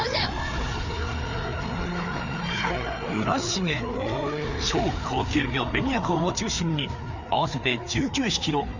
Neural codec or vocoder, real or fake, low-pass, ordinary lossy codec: codec, 16 kHz, 4 kbps, FreqCodec, larger model; fake; 7.2 kHz; none